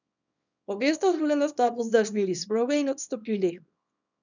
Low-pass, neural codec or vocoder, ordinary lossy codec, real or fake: 7.2 kHz; codec, 24 kHz, 0.9 kbps, WavTokenizer, small release; none; fake